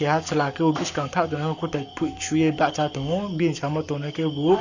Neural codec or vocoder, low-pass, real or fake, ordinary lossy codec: codec, 44.1 kHz, 7.8 kbps, Pupu-Codec; 7.2 kHz; fake; none